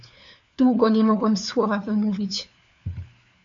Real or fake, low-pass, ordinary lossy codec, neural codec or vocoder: fake; 7.2 kHz; MP3, 48 kbps; codec, 16 kHz, 4 kbps, FunCodec, trained on LibriTTS, 50 frames a second